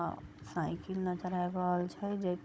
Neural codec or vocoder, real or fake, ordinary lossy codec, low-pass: codec, 16 kHz, 16 kbps, FunCodec, trained on Chinese and English, 50 frames a second; fake; none; none